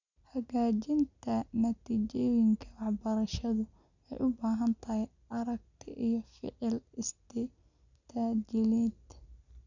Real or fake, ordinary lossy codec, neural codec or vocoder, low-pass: real; none; none; 7.2 kHz